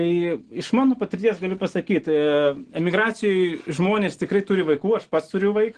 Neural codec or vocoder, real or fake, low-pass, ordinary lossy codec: none; real; 9.9 kHz; Opus, 16 kbps